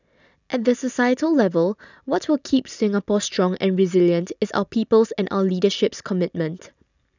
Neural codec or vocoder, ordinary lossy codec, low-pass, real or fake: none; none; 7.2 kHz; real